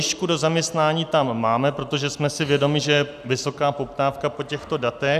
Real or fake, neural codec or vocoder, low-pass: real; none; 14.4 kHz